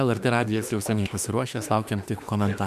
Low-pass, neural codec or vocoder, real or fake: 14.4 kHz; autoencoder, 48 kHz, 32 numbers a frame, DAC-VAE, trained on Japanese speech; fake